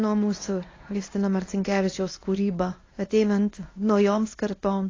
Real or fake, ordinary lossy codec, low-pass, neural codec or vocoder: fake; AAC, 32 kbps; 7.2 kHz; codec, 24 kHz, 0.9 kbps, WavTokenizer, medium speech release version 1